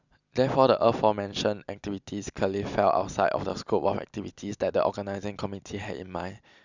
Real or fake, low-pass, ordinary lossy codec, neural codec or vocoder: real; 7.2 kHz; none; none